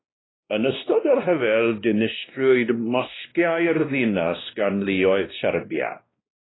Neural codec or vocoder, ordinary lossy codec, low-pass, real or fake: codec, 16 kHz, 1 kbps, X-Codec, WavLM features, trained on Multilingual LibriSpeech; AAC, 16 kbps; 7.2 kHz; fake